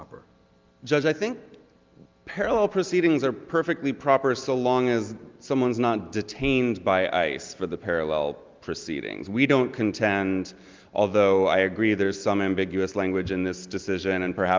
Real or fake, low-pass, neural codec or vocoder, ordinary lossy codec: real; 7.2 kHz; none; Opus, 24 kbps